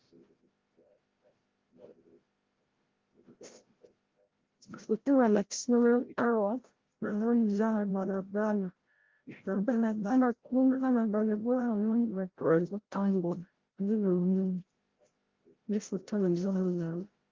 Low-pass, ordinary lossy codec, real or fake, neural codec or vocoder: 7.2 kHz; Opus, 16 kbps; fake; codec, 16 kHz, 0.5 kbps, FreqCodec, larger model